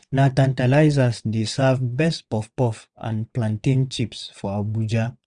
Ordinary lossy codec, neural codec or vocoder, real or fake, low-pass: none; vocoder, 22.05 kHz, 80 mel bands, WaveNeXt; fake; 9.9 kHz